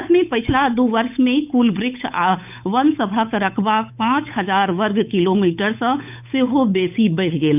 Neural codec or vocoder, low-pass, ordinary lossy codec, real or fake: codec, 16 kHz, 8 kbps, FunCodec, trained on Chinese and English, 25 frames a second; 3.6 kHz; none; fake